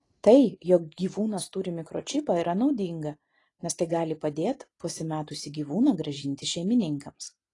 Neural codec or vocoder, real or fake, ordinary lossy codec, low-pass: none; real; AAC, 32 kbps; 10.8 kHz